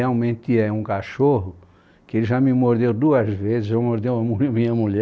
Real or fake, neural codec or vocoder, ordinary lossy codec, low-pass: real; none; none; none